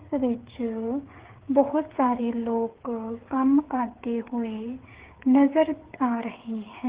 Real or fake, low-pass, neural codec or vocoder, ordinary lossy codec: fake; 3.6 kHz; codec, 16 kHz, 8 kbps, FreqCodec, smaller model; Opus, 16 kbps